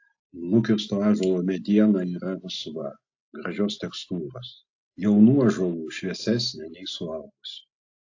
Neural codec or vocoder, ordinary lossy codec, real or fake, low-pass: none; AAC, 48 kbps; real; 7.2 kHz